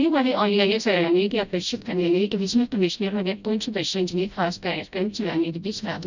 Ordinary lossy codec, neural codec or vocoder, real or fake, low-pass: none; codec, 16 kHz, 0.5 kbps, FreqCodec, smaller model; fake; 7.2 kHz